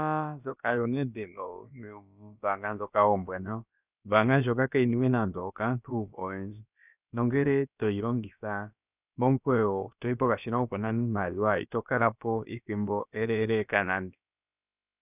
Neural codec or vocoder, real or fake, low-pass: codec, 16 kHz, about 1 kbps, DyCAST, with the encoder's durations; fake; 3.6 kHz